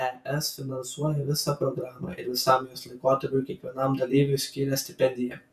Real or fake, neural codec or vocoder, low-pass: fake; vocoder, 48 kHz, 128 mel bands, Vocos; 14.4 kHz